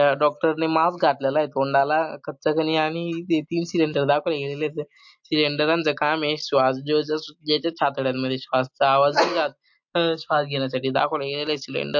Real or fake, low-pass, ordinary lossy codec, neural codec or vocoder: real; 7.2 kHz; MP3, 48 kbps; none